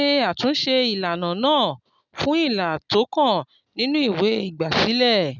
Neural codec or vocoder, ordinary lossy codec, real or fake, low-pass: none; none; real; 7.2 kHz